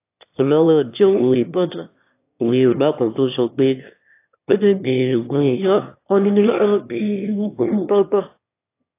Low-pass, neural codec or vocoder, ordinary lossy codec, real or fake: 3.6 kHz; autoencoder, 22.05 kHz, a latent of 192 numbers a frame, VITS, trained on one speaker; AAC, 24 kbps; fake